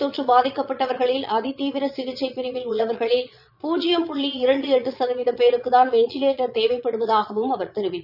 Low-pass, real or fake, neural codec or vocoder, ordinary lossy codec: 5.4 kHz; fake; vocoder, 22.05 kHz, 80 mel bands, Vocos; none